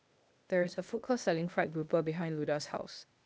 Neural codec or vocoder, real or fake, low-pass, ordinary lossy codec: codec, 16 kHz, 0.8 kbps, ZipCodec; fake; none; none